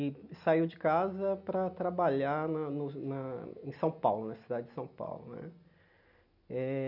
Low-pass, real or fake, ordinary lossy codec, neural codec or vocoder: 5.4 kHz; real; none; none